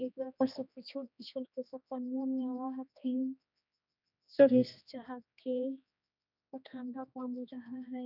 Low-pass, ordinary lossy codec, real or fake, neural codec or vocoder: 5.4 kHz; none; fake; codec, 16 kHz, 2 kbps, X-Codec, HuBERT features, trained on general audio